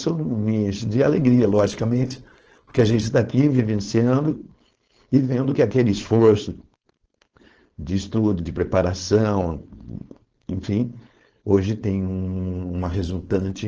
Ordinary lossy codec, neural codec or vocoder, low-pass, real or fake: Opus, 16 kbps; codec, 16 kHz, 4.8 kbps, FACodec; 7.2 kHz; fake